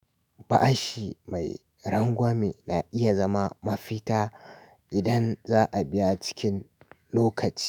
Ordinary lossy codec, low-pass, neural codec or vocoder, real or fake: none; none; autoencoder, 48 kHz, 128 numbers a frame, DAC-VAE, trained on Japanese speech; fake